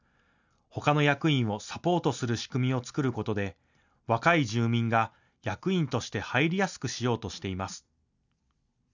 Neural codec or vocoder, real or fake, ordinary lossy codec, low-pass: none; real; none; 7.2 kHz